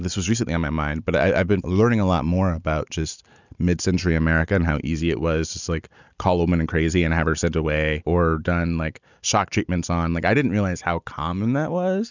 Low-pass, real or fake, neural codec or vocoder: 7.2 kHz; real; none